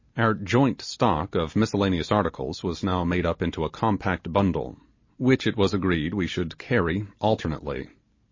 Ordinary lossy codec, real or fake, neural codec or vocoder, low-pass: MP3, 32 kbps; real; none; 7.2 kHz